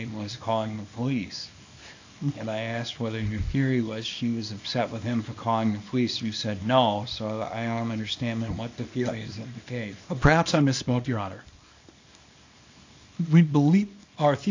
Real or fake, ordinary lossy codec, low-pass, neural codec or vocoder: fake; AAC, 48 kbps; 7.2 kHz; codec, 24 kHz, 0.9 kbps, WavTokenizer, small release